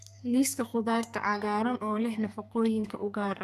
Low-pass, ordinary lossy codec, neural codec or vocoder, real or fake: 14.4 kHz; none; codec, 32 kHz, 1.9 kbps, SNAC; fake